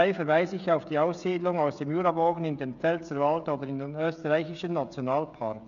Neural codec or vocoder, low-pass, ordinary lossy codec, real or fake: codec, 16 kHz, 16 kbps, FreqCodec, smaller model; 7.2 kHz; none; fake